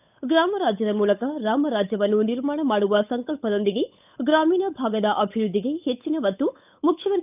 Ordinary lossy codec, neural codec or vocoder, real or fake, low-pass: none; codec, 16 kHz, 8 kbps, FunCodec, trained on Chinese and English, 25 frames a second; fake; 3.6 kHz